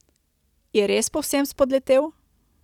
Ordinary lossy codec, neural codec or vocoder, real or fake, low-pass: none; none; real; 19.8 kHz